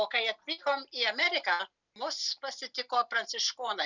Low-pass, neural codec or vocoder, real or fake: 7.2 kHz; none; real